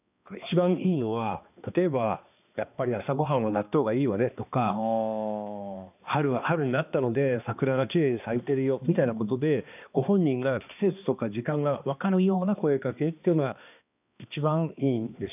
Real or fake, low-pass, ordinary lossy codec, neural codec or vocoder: fake; 3.6 kHz; none; codec, 16 kHz, 2 kbps, X-Codec, HuBERT features, trained on balanced general audio